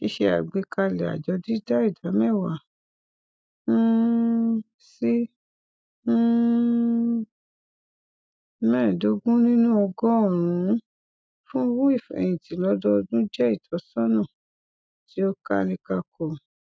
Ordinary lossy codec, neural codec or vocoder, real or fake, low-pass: none; none; real; none